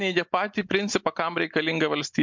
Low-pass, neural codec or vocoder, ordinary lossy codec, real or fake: 7.2 kHz; none; MP3, 64 kbps; real